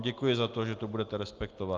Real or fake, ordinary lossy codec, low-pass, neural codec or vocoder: real; Opus, 24 kbps; 7.2 kHz; none